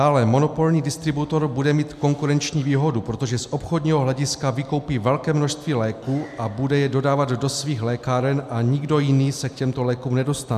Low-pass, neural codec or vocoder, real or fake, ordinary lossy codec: 14.4 kHz; none; real; MP3, 96 kbps